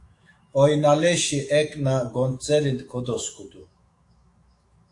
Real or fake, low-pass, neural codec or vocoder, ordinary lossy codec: fake; 10.8 kHz; autoencoder, 48 kHz, 128 numbers a frame, DAC-VAE, trained on Japanese speech; AAC, 48 kbps